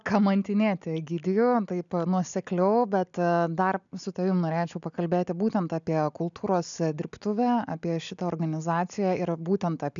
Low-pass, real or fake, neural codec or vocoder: 7.2 kHz; real; none